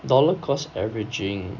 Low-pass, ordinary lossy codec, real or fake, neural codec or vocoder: 7.2 kHz; none; real; none